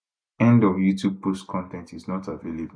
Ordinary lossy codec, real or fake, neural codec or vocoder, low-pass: none; real; none; 9.9 kHz